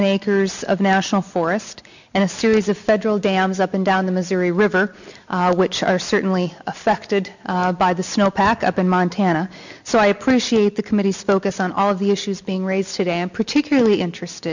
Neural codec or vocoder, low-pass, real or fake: none; 7.2 kHz; real